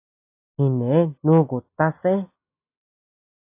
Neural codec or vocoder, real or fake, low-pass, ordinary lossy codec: none; real; 3.6 kHz; AAC, 24 kbps